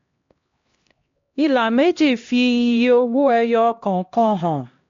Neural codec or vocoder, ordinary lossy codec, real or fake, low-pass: codec, 16 kHz, 1 kbps, X-Codec, HuBERT features, trained on LibriSpeech; MP3, 48 kbps; fake; 7.2 kHz